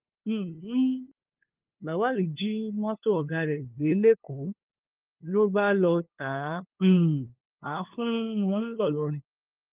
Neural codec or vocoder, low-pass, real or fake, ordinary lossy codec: codec, 16 kHz, 2 kbps, FunCodec, trained on LibriTTS, 25 frames a second; 3.6 kHz; fake; Opus, 32 kbps